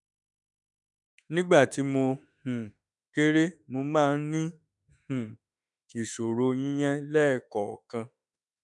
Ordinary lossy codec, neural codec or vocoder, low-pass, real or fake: none; autoencoder, 48 kHz, 32 numbers a frame, DAC-VAE, trained on Japanese speech; 10.8 kHz; fake